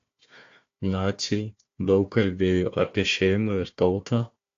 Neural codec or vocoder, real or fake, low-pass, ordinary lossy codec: codec, 16 kHz, 1 kbps, FunCodec, trained on Chinese and English, 50 frames a second; fake; 7.2 kHz; AAC, 48 kbps